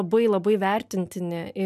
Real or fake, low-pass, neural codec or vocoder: real; 14.4 kHz; none